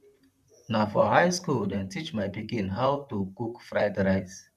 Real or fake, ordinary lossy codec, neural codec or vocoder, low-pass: fake; none; vocoder, 44.1 kHz, 128 mel bands, Pupu-Vocoder; 14.4 kHz